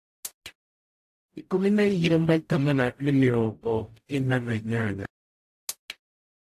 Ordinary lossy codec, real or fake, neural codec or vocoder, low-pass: AAC, 64 kbps; fake; codec, 44.1 kHz, 0.9 kbps, DAC; 14.4 kHz